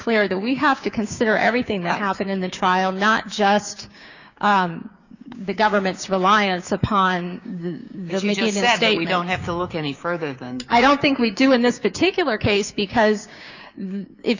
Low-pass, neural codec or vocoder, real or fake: 7.2 kHz; codec, 16 kHz, 6 kbps, DAC; fake